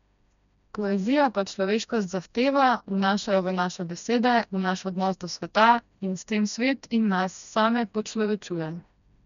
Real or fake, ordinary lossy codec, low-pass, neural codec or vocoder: fake; none; 7.2 kHz; codec, 16 kHz, 1 kbps, FreqCodec, smaller model